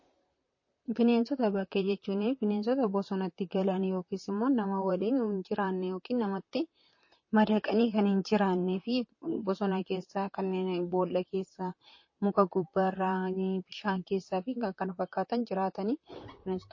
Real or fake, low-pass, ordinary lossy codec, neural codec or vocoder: fake; 7.2 kHz; MP3, 32 kbps; vocoder, 44.1 kHz, 128 mel bands, Pupu-Vocoder